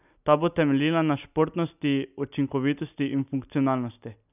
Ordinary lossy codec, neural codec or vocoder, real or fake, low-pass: none; none; real; 3.6 kHz